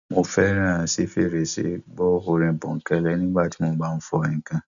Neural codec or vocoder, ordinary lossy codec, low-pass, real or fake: none; none; 7.2 kHz; real